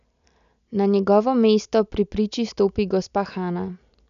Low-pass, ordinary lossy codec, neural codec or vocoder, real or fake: 7.2 kHz; none; none; real